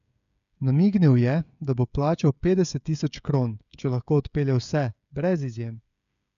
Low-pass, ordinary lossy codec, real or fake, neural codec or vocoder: 7.2 kHz; none; fake; codec, 16 kHz, 16 kbps, FreqCodec, smaller model